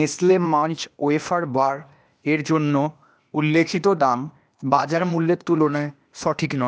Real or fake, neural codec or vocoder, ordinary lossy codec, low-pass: fake; codec, 16 kHz, 0.8 kbps, ZipCodec; none; none